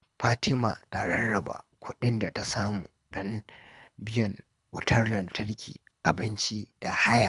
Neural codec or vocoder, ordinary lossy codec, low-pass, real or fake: codec, 24 kHz, 3 kbps, HILCodec; none; 10.8 kHz; fake